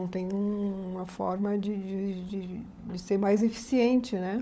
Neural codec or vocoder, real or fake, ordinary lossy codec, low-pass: codec, 16 kHz, 16 kbps, FunCodec, trained on LibriTTS, 50 frames a second; fake; none; none